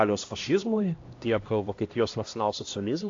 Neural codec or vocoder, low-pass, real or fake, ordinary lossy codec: codec, 16 kHz, 1 kbps, X-Codec, HuBERT features, trained on LibriSpeech; 7.2 kHz; fake; AAC, 48 kbps